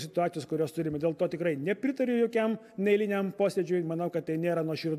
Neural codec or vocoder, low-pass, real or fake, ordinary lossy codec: none; 14.4 kHz; real; AAC, 96 kbps